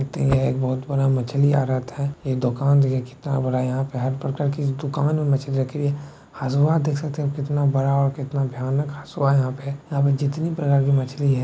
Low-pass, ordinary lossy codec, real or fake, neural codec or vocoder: none; none; real; none